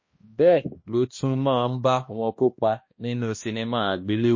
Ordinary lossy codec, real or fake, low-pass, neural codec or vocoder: MP3, 32 kbps; fake; 7.2 kHz; codec, 16 kHz, 1 kbps, X-Codec, HuBERT features, trained on balanced general audio